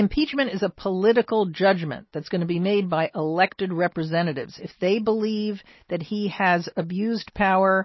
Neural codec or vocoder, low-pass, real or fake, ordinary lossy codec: none; 7.2 kHz; real; MP3, 24 kbps